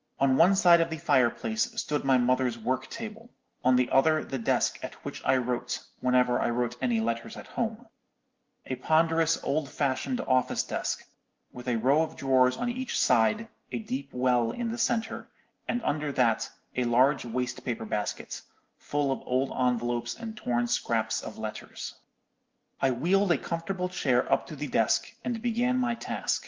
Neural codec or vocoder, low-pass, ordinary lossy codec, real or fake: none; 7.2 kHz; Opus, 32 kbps; real